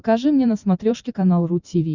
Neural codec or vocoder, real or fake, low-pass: vocoder, 44.1 kHz, 128 mel bands every 512 samples, BigVGAN v2; fake; 7.2 kHz